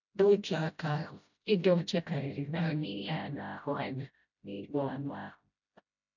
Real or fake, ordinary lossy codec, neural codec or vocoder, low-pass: fake; none; codec, 16 kHz, 0.5 kbps, FreqCodec, smaller model; 7.2 kHz